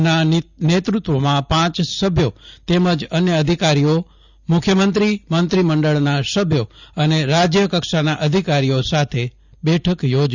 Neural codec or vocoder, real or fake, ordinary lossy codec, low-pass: none; real; none; 7.2 kHz